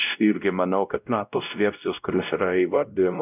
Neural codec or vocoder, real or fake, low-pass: codec, 16 kHz, 0.5 kbps, X-Codec, WavLM features, trained on Multilingual LibriSpeech; fake; 3.6 kHz